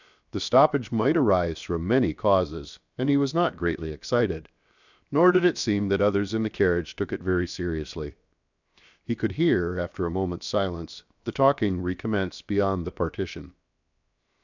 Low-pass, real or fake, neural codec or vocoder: 7.2 kHz; fake; codec, 16 kHz, 0.7 kbps, FocalCodec